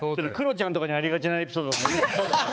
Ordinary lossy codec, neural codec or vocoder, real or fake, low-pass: none; codec, 16 kHz, 4 kbps, X-Codec, HuBERT features, trained on balanced general audio; fake; none